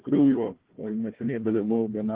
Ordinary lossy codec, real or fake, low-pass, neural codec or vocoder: Opus, 16 kbps; fake; 3.6 kHz; codec, 16 kHz, 1 kbps, FunCodec, trained on Chinese and English, 50 frames a second